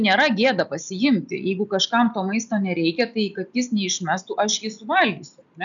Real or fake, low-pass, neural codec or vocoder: real; 7.2 kHz; none